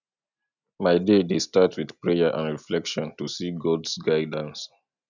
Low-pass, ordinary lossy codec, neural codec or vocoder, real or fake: 7.2 kHz; none; none; real